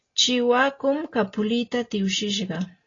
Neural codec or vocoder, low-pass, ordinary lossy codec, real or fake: none; 7.2 kHz; AAC, 32 kbps; real